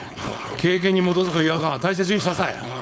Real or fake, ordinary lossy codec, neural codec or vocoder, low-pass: fake; none; codec, 16 kHz, 4.8 kbps, FACodec; none